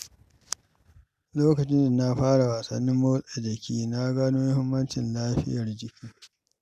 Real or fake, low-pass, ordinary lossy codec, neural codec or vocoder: real; 14.4 kHz; none; none